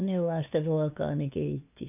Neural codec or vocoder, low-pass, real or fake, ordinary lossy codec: codec, 16 kHz, 2 kbps, FunCodec, trained on LibriTTS, 25 frames a second; 3.6 kHz; fake; none